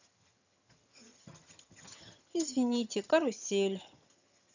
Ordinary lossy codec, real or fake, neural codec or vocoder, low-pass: none; fake; vocoder, 22.05 kHz, 80 mel bands, HiFi-GAN; 7.2 kHz